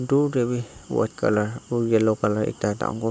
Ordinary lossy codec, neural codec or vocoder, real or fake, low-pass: none; none; real; none